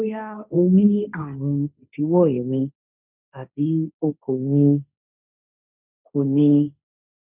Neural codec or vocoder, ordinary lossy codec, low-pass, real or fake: codec, 16 kHz, 1.1 kbps, Voila-Tokenizer; none; 3.6 kHz; fake